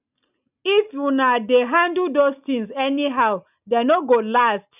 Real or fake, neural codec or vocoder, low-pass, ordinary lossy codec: real; none; 3.6 kHz; none